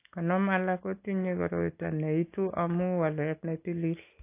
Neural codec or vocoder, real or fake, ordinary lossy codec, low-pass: none; real; AAC, 24 kbps; 3.6 kHz